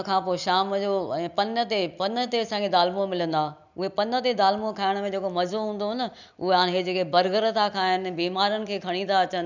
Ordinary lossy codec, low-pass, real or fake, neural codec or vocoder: none; 7.2 kHz; real; none